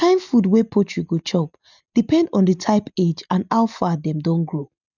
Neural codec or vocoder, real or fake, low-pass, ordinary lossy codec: none; real; 7.2 kHz; none